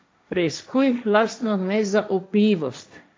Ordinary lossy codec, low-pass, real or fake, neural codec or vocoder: none; none; fake; codec, 16 kHz, 1.1 kbps, Voila-Tokenizer